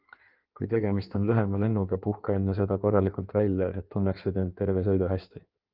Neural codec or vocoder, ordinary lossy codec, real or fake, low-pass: codec, 16 kHz in and 24 kHz out, 1.1 kbps, FireRedTTS-2 codec; Opus, 32 kbps; fake; 5.4 kHz